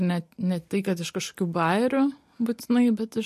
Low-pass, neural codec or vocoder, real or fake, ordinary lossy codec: 14.4 kHz; none; real; MP3, 64 kbps